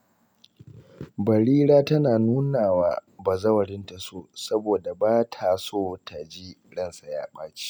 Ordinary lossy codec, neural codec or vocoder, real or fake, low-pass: none; none; real; none